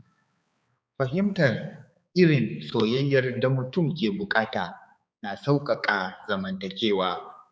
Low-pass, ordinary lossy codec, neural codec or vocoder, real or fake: none; none; codec, 16 kHz, 4 kbps, X-Codec, HuBERT features, trained on balanced general audio; fake